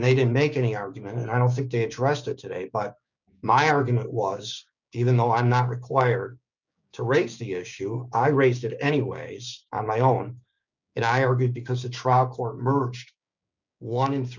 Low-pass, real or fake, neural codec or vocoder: 7.2 kHz; fake; codec, 16 kHz, 6 kbps, DAC